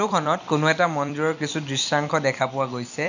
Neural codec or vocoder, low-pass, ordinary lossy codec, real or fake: vocoder, 44.1 kHz, 128 mel bands every 256 samples, BigVGAN v2; 7.2 kHz; none; fake